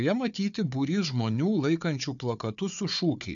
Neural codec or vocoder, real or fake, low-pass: codec, 16 kHz, 4 kbps, FunCodec, trained on Chinese and English, 50 frames a second; fake; 7.2 kHz